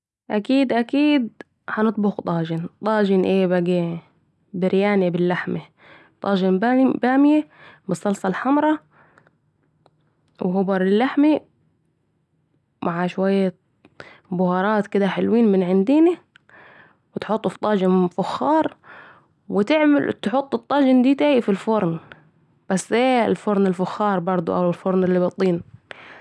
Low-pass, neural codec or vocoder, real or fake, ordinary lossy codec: none; none; real; none